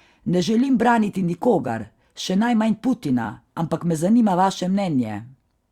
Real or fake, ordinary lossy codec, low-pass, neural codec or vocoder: fake; Opus, 64 kbps; 19.8 kHz; vocoder, 44.1 kHz, 128 mel bands every 256 samples, BigVGAN v2